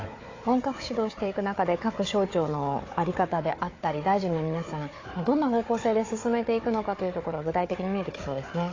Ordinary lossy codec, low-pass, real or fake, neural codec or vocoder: AAC, 32 kbps; 7.2 kHz; fake; codec, 16 kHz, 4 kbps, FunCodec, trained on Chinese and English, 50 frames a second